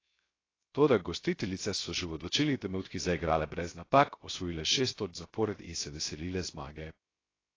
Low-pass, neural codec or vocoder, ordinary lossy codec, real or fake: 7.2 kHz; codec, 16 kHz, 0.3 kbps, FocalCodec; AAC, 32 kbps; fake